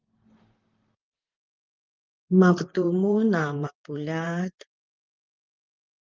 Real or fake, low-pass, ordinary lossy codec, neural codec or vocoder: fake; 7.2 kHz; Opus, 24 kbps; vocoder, 44.1 kHz, 128 mel bands, Pupu-Vocoder